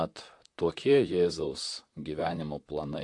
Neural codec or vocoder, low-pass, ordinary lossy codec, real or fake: vocoder, 44.1 kHz, 128 mel bands, Pupu-Vocoder; 10.8 kHz; AAC, 48 kbps; fake